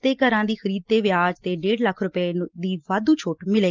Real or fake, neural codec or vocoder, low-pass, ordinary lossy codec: real; none; 7.2 kHz; Opus, 32 kbps